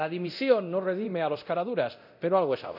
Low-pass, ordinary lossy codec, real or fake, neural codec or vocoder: 5.4 kHz; none; fake; codec, 24 kHz, 0.9 kbps, DualCodec